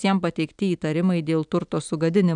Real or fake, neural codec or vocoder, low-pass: real; none; 9.9 kHz